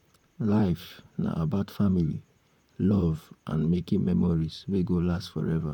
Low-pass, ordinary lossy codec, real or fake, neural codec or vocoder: 19.8 kHz; none; fake; vocoder, 44.1 kHz, 128 mel bands, Pupu-Vocoder